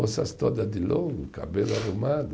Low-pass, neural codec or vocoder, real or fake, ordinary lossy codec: none; none; real; none